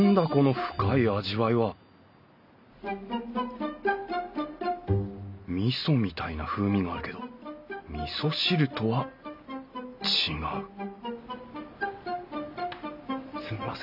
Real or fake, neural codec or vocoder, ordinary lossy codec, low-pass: real; none; none; 5.4 kHz